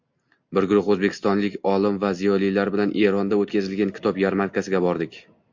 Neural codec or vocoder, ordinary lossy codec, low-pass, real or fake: none; MP3, 48 kbps; 7.2 kHz; real